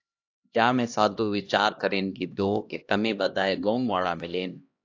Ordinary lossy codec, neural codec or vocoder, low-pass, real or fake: AAC, 48 kbps; codec, 16 kHz, 1 kbps, X-Codec, HuBERT features, trained on LibriSpeech; 7.2 kHz; fake